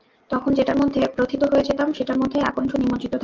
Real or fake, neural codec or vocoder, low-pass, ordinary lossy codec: real; none; 7.2 kHz; Opus, 24 kbps